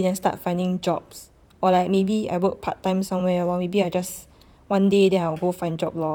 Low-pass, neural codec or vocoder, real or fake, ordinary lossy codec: 19.8 kHz; vocoder, 44.1 kHz, 128 mel bands, Pupu-Vocoder; fake; none